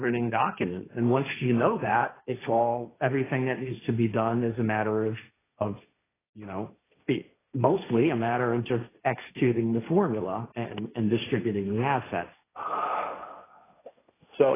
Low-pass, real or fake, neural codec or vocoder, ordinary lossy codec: 3.6 kHz; fake; codec, 16 kHz, 1.1 kbps, Voila-Tokenizer; AAC, 16 kbps